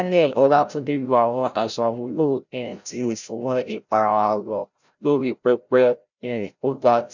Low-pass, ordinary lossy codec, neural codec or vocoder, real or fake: 7.2 kHz; none; codec, 16 kHz, 0.5 kbps, FreqCodec, larger model; fake